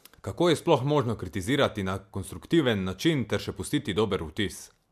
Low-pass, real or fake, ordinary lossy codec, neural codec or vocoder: 14.4 kHz; real; MP3, 96 kbps; none